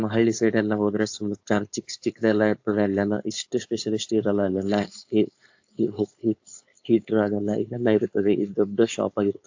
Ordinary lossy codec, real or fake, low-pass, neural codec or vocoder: AAC, 48 kbps; fake; 7.2 kHz; codec, 16 kHz, 4.8 kbps, FACodec